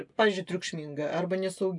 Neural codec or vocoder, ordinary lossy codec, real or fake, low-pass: none; MP3, 96 kbps; real; 10.8 kHz